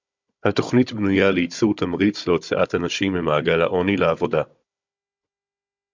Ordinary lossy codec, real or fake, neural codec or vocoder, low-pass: MP3, 64 kbps; fake; codec, 16 kHz, 16 kbps, FunCodec, trained on Chinese and English, 50 frames a second; 7.2 kHz